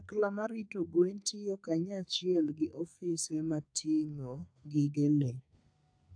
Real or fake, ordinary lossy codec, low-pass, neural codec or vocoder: fake; AAC, 64 kbps; 9.9 kHz; codec, 32 kHz, 1.9 kbps, SNAC